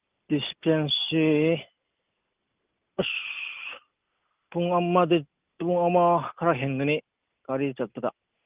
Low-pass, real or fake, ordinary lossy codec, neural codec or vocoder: 3.6 kHz; real; Opus, 24 kbps; none